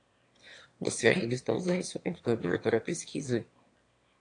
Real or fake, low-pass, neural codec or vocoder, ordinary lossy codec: fake; 9.9 kHz; autoencoder, 22.05 kHz, a latent of 192 numbers a frame, VITS, trained on one speaker; AAC, 48 kbps